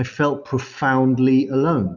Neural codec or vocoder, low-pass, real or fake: none; 7.2 kHz; real